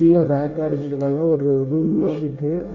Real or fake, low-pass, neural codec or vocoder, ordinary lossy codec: fake; 7.2 kHz; codec, 24 kHz, 1 kbps, SNAC; none